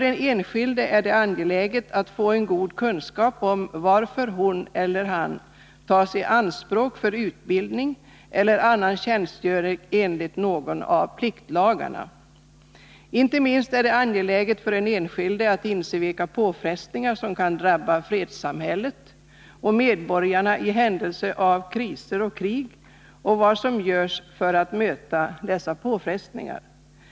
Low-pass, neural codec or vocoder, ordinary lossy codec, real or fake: none; none; none; real